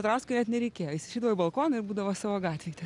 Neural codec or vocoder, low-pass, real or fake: none; 10.8 kHz; real